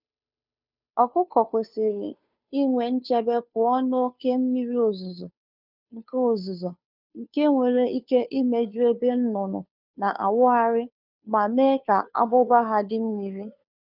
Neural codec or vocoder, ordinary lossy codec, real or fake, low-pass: codec, 16 kHz, 2 kbps, FunCodec, trained on Chinese and English, 25 frames a second; none; fake; 5.4 kHz